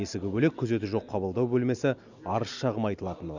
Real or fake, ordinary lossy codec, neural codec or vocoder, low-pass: real; none; none; 7.2 kHz